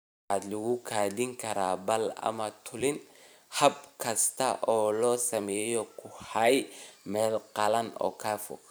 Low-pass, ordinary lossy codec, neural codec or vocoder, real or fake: none; none; vocoder, 44.1 kHz, 128 mel bands every 512 samples, BigVGAN v2; fake